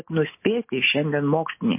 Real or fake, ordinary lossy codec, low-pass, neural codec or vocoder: real; MP3, 24 kbps; 3.6 kHz; none